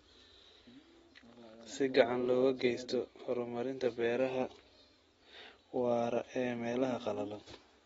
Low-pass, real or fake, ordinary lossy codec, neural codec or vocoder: 19.8 kHz; real; AAC, 24 kbps; none